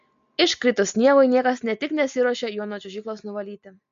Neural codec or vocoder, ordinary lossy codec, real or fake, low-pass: none; MP3, 48 kbps; real; 7.2 kHz